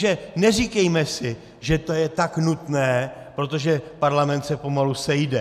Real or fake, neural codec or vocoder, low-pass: real; none; 14.4 kHz